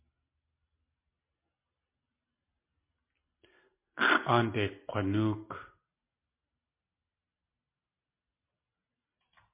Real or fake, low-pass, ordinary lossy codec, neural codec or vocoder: real; 3.6 kHz; MP3, 24 kbps; none